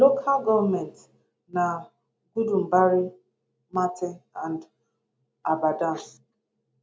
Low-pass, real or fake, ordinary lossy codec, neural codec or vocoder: none; real; none; none